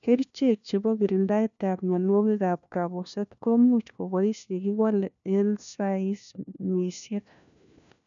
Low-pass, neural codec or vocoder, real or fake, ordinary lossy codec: 7.2 kHz; codec, 16 kHz, 1 kbps, FunCodec, trained on LibriTTS, 50 frames a second; fake; none